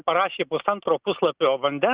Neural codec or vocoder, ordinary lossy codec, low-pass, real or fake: none; Opus, 64 kbps; 3.6 kHz; real